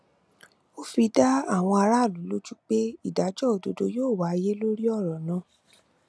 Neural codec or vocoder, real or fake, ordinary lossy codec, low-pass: none; real; none; none